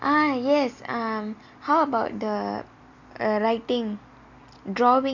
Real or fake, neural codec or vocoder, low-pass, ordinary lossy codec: real; none; 7.2 kHz; none